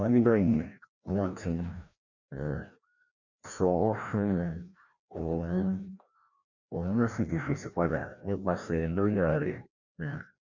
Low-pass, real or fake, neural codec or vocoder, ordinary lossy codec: 7.2 kHz; fake; codec, 16 kHz, 1 kbps, FreqCodec, larger model; none